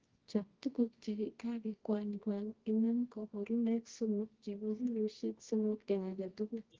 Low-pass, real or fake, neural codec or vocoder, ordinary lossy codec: 7.2 kHz; fake; codec, 24 kHz, 0.9 kbps, WavTokenizer, medium music audio release; Opus, 16 kbps